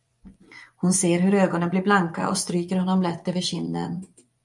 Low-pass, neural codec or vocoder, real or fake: 10.8 kHz; vocoder, 24 kHz, 100 mel bands, Vocos; fake